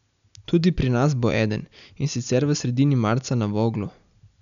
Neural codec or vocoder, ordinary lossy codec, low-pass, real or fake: none; none; 7.2 kHz; real